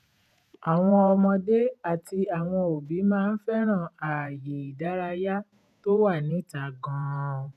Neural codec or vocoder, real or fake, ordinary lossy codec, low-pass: vocoder, 48 kHz, 128 mel bands, Vocos; fake; none; 14.4 kHz